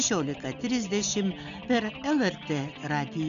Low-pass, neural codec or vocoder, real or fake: 7.2 kHz; none; real